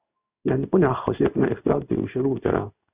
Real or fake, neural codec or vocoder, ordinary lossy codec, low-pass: fake; codec, 16 kHz in and 24 kHz out, 1 kbps, XY-Tokenizer; Opus, 64 kbps; 3.6 kHz